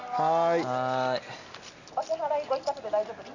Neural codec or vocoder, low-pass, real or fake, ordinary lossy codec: none; 7.2 kHz; real; none